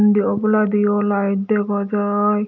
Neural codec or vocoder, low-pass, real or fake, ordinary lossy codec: none; 7.2 kHz; real; none